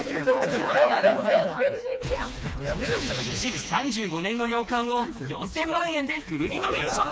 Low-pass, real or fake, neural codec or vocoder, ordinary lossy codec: none; fake; codec, 16 kHz, 2 kbps, FreqCodec, smaller model; none